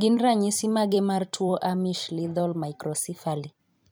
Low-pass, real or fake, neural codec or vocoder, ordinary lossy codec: none; fake; vocoder, 44.1 kHz, 128 mel bands every 256 samples, BigVGAN v2; none